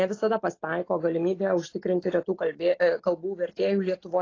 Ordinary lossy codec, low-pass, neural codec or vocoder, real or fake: AAC, 32 kbps; 7.2 kHz; none; real